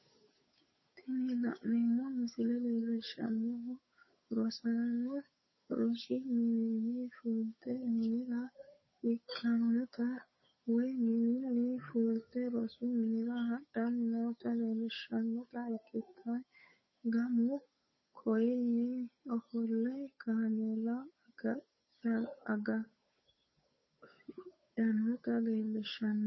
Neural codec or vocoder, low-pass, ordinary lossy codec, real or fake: codec, 16 kHz, 8 kbps, FunCodec, trained on Chinese and English, 25 frames a second; 7.2 kHz; MP3, 24 kbps; fake